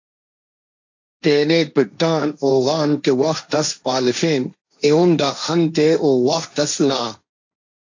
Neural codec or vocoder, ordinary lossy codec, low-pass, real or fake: codec, 16 kHz, 1.1 kbps, Voila-Tokenizer; AAC, 48 kbps; 7.2 kHz; fake